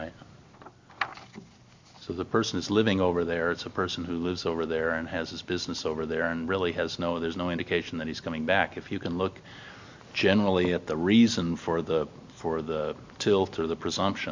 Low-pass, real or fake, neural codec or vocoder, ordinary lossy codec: 7.2 kHz; real; none; MP3, 64 kbps